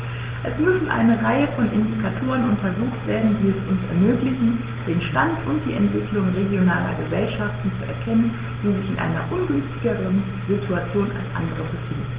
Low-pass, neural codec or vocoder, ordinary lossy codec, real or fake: 3.6 kHz; none; Opus, 16 kbps; real